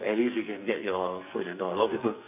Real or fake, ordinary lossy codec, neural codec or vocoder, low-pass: fake; none; codec, 44.1 kHz, 2.6 kbps, SNAC; 3.6 kHz